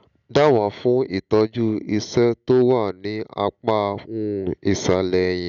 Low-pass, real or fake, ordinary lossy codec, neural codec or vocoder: 7.2 kHz; real; none; none